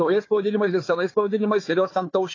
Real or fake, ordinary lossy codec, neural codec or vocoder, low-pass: fake; AAC, 32 kbps; codec, 16 kHz, 4 kbps, FunCodec, trained on Chinese and English, 50 frames a second; 7.2 kHz